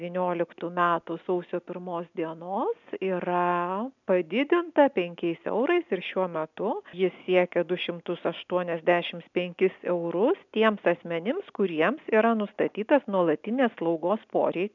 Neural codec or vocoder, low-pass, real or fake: none; 7.2 kHz; real